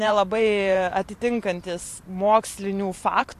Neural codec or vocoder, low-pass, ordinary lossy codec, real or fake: vocoder, 44.1 kHz, 128 mel bands every 512 samples, BigVGAN v2; 14.4 kHz; AAC, 64 kbps; fake